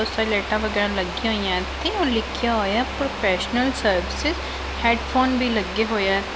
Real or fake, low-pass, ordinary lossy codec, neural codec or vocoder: real; none; none; none